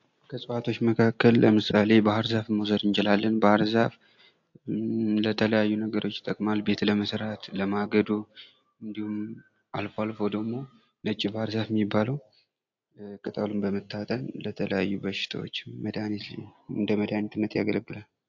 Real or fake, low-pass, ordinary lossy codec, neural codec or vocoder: real; 7.2 kHz; AAC, 48 kbps; none